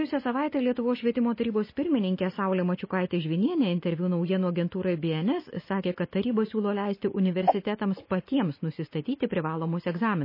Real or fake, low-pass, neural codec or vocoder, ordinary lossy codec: real; 5.4 kHz; none; MP3, 24 kbps